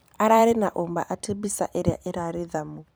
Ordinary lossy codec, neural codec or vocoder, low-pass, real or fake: none; vocoder, 44.1 kHz, 128 mel bands every 256 samples, BigVGAN v2; none; fake